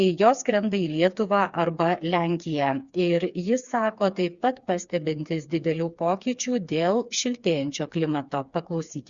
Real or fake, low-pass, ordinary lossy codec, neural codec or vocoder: fake; 7.2 kHz; Opus, 64 kbps; codec, 16 kHz, 4 kbps, FreqCodec, smaller model